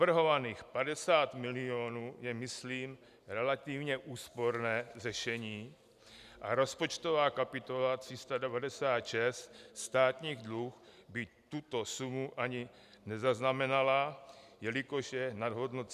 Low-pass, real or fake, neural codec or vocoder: 14.4 kHz; real; none